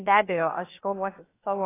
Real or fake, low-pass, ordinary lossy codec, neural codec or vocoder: fake; 3.6 kHz; AAC, 16 kbps; codec, 16 kHz, about 1 kbps, DyCAST, with the encoder's durations